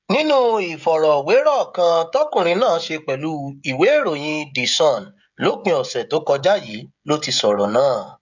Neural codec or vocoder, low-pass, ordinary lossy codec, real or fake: codec, 16 kHz, 16 kbps, FreqCodec, smaller model; 7.2 kHz; none; fake